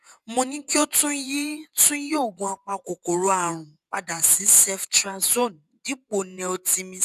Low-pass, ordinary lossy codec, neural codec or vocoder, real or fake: 14.4 kHz; none; vocoder, 48 kHz, 128 mel bands, Vocos; fake